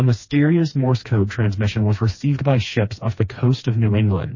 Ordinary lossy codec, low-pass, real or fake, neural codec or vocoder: MP3, 32 kbps; 7.2 kHz; fake; codec, 16 kHz, 2 kbps, FreqCodec, smaller model